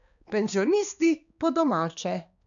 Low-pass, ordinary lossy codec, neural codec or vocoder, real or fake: 7.2 kHz; none; codec, 16 kHz, 2 kbps, X-Codec, HuBERT features, trained on balanced general audio; fake